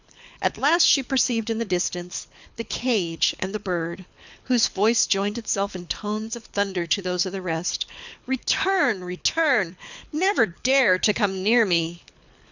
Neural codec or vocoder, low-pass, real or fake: codec, 24 kHz, 6 kbps, HILCodec; 7.2 kHz; fake